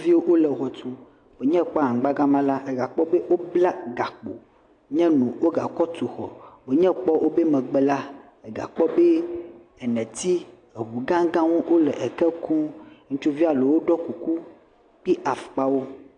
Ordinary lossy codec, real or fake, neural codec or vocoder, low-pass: AAC, 48 kbps; real; none; 9.9 kHz